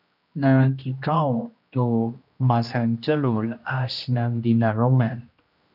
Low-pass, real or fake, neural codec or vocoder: 5.4 kHz; fake; codec, 16 kHz, 1 kbps, X-Codec, HuBERT features, trained on general audio